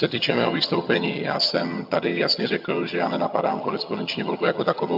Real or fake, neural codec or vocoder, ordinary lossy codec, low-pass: fake; vocoder, 22.05 kHz, 80 mel bands, HiFi-GAN; MP3, 48 kbps; 5.4 kHz